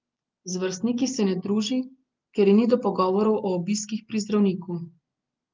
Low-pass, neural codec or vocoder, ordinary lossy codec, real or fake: 7.2 kHz; none; Opus, 32 kbps; real